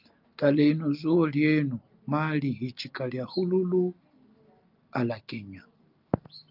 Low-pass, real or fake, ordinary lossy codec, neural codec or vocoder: 5.4 kHz; real; Opus, 24 kbps; none